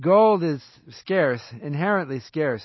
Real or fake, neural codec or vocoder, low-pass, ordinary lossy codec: real; none; 7.2 kHz; MP3, 24 kbps